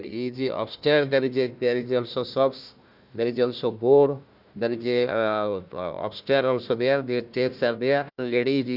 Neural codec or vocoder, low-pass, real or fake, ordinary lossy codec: codec, 16 kHz, 1 kbps, FunCodec, trained on Chinese and English, 50 frames a second; 5.4 kHz; fake; none